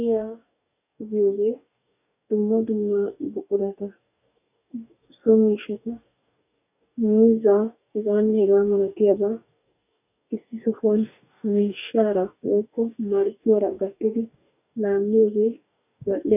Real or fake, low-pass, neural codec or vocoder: fake; 3.6 kHz; codec, 44.1 kHz, 2.6 kbps, DAC